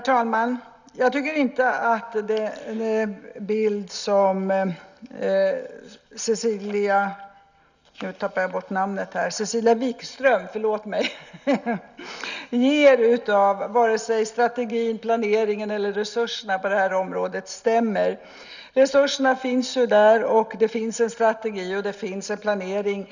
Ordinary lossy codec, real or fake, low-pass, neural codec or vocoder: none; real; 7.2 kHz; none